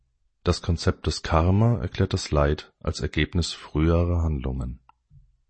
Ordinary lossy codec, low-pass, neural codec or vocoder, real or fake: MP3, 32 kbps; 9.9 kHz; none; real